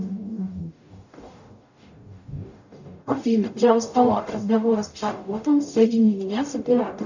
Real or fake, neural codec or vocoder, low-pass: fake; codec, 44.1 kHz, 0.9 kbps, DAC; 7.2 kHz